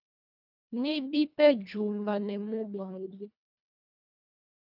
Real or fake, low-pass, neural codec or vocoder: fake; 5.4 kHz; codec, 24 kHz, 1.5 kbps, HILCodec